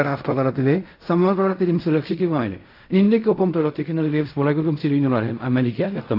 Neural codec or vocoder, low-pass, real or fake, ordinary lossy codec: codec, 16 kHz in and 24 kHz out, 0.4 kbps, LongCat-Audio-Codec, fine tuned four codebook decoder; 5.4 kHz; fake; AAC, 32 kbps